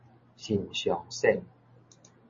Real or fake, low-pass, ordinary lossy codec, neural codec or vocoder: real; 7.2 kHz; MP3, 32 kbps; none